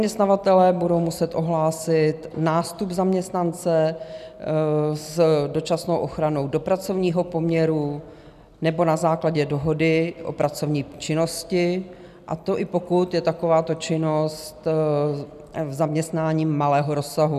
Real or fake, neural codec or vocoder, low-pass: real; none; 14.4 kHz